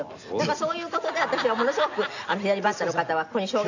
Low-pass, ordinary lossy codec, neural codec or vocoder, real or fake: 7.2 kHz; none; none; real